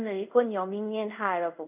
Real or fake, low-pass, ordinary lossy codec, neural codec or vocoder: fake; 3.6 kHz; none; codec, 24 kHz, 0.5 kbps, DualCodec